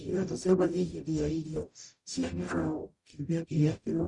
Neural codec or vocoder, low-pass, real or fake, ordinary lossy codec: codec, 44.1 kHz, 0.9 kbps, DAC; 10.8 kHz; fake; Opus, 64 kbps